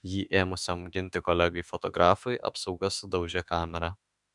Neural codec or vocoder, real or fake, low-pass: autoencoder, 48 kHz, 32 numbers a frame, DAC-VAE, trained on Japanese speech; fake; 10.8 kHz